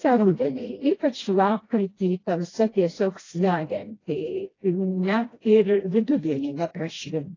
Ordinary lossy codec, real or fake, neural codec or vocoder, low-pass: AAC, 32 kbps; fake; codec, 16 kHz, 1 kbps, FreqCodec, smaller model; 7.2 kHz